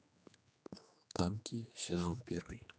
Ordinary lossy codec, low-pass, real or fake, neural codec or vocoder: none; none; fake; codec, 16 kHz, 2 kbps, X-Codec, HuBERT features, trained on general audio